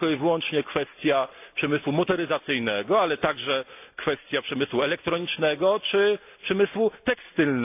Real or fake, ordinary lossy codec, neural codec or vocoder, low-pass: real; AAC, 32 kbps; none; 3.6 kHz